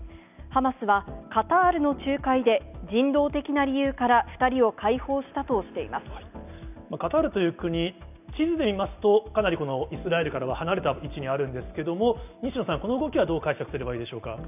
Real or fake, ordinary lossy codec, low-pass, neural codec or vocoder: real; none; 3.6 kHz; none